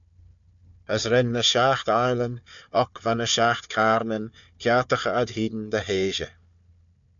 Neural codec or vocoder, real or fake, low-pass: codec, 16 kHz, 4 kbps, FunCodec, trained on Chinese and English, 50 frames a second; fake; 7.2 kHz